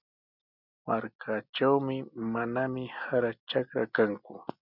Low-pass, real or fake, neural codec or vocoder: 5.4 kHz; real; none